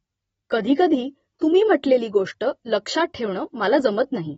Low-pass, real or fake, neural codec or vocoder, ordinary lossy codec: 19.8 kHz; real; none; AAC, 24 kbps